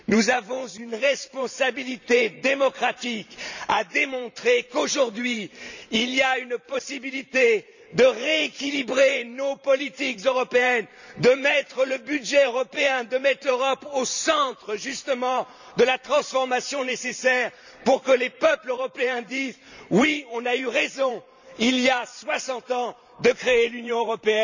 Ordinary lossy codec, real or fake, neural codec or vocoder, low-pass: none; fake; vocoder, 44.1 kHz, 128 mel bands every 512 samples, BigVGAN v2; 7.2 kHz